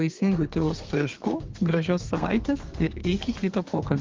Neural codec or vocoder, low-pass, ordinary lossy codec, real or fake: codec, 32 kHz, 1.9 kbps, SNAC; 7.2 kHz; Opus, 24 kbps; fake